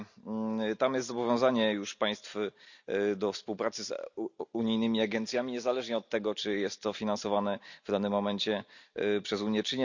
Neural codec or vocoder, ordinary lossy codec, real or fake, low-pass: none; none; real; 7.2 kHz